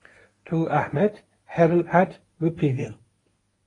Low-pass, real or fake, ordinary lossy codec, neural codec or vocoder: 10.8 kHz; fake; AAC, 32 kbps; codec, 24 kHz, 0.9 kbps, WavTokenizer, medium speech release version 1